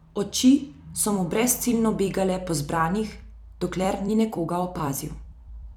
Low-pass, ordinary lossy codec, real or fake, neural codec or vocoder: 19.8 kHz; none; fake; vocoder, 44.1 kHz, 128 mel bands every 256 samples, BigVGAN v2